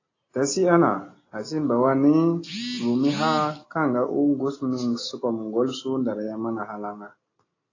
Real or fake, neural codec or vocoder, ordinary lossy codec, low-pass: real; none; AAC, 32 kbps; 7.2 kHz